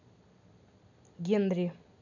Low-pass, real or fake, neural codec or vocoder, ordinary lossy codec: 7.2 kHz; real; none; none